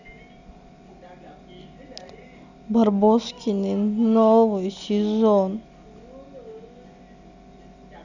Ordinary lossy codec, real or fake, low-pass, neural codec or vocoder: none; real; 7.2 kHz; none